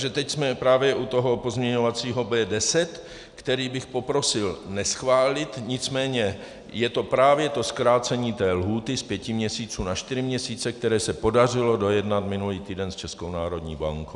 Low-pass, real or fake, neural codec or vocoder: 10.8 kHz; real; none